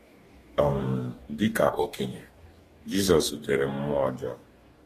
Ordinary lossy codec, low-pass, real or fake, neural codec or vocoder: AAC, 48 kbps; 14.4 kHz; fake; codec, 44.1 kHz, 2.6 kbps, DAC